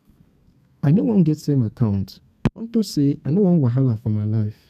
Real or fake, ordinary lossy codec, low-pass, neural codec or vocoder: fake; none; 14.4 kHz; codec, 32 kHz, 1.9 kbps, SNAC